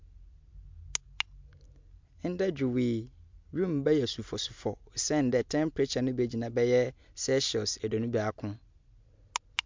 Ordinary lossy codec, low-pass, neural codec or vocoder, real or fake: MP3, 64 kbps; 7.2 kHz; none; real